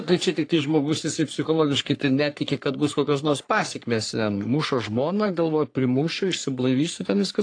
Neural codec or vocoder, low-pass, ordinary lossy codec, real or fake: codec, 44.1 kHz, 3.4 kbps, Pupu-Codec; 9.9 kHz; AAC, 48 kbps; fake